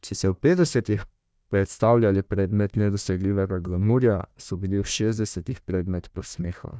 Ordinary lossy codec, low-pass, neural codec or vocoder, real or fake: none; none; codec, 16 kHz, 1 kbps, FunCodec, trained on Chinese and English, 50 frames a second; fake